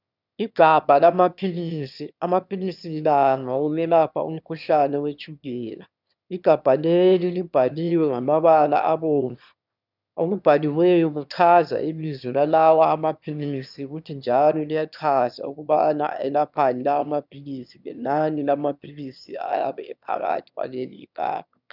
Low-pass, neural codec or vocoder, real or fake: 5.4 kHz; autoencoder, 22.05 kHz, a latent of 192 numbers a frame, VITS, trained on one speaker; fake